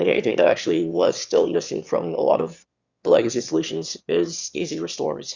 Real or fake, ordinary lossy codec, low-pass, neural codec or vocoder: fake; Opus, 64 kbps; 7.2 kHz; autoencoder, 22.05 kHz, a latent of 192 numbers a frame, VITS, trained on one speaker